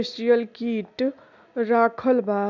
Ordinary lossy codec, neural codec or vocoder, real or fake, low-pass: none; none; real; 7.2 kHz